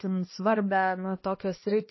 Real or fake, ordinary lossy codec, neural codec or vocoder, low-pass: fake; MP3, 24 kbps; codec, 16 kHz, 1 kbps, X-Codec, HuBERT features, trained on balanced general audio; 7.2 kHz